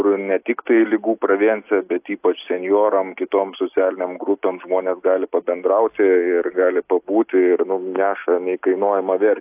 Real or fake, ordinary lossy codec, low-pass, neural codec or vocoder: real; AAC, 32 kbps; 3.6 kHz; none